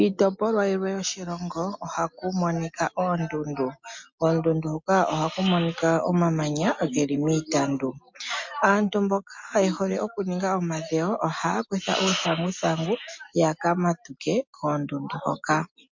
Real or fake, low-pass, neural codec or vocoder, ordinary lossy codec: real; 7.2 kHz; none; MP3, 48 kbps